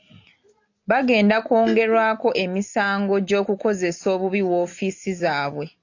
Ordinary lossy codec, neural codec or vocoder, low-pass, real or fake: AAC, 48 kbps; none; 7.2 kHz; real